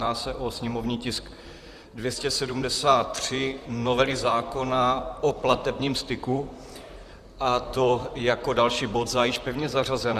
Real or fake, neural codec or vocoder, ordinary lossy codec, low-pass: fake; vocoder, 44.1 kHz, 128 mel bands, Pupu-Vocoder; Opus, 64 kbps; 14.4 kHz